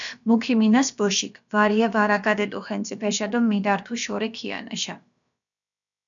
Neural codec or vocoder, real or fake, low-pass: codec, 16 kHz, about 1 kbps, DyCAST, with the encoder's durations; fake; 7.2 kHz